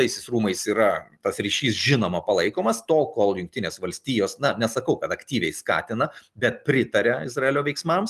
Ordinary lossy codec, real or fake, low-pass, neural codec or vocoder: Opus, 32 kbps; real; 14.4 kHz; none